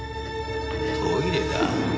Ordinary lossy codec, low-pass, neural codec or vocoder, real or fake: none; none; none; real